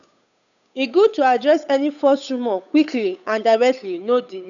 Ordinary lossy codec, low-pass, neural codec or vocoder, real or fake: none; 7.2 kHz; codec, 16 kHz, 8 kbps, FunCodec, trained on Chinese and English, 25 frames a second; fake